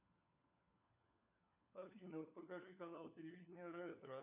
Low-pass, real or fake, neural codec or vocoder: 3.6 kHz; fake; codec, 16 kHz, 4 kbps, FunCodec, trained on LibriTTS, 50 frames a second